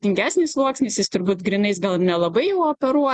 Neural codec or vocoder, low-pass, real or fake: none; 10.8 kHz; real